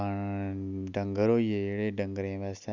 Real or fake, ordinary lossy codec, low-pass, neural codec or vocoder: real; Opus, 64 kbps; 7.2 kHz; none